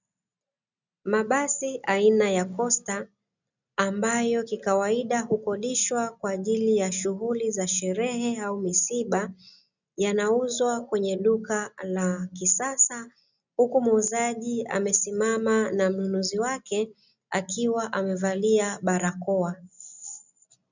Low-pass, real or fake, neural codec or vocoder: 7.2 kHz; real; none